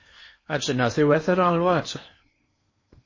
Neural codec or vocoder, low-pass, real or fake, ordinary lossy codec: codec, 16 kHz in and 24 kHz out, 0.8 kbps, FocalCodec, streaming, 65536 codes; 7.2 kHz; fake; MP3, 32 kbps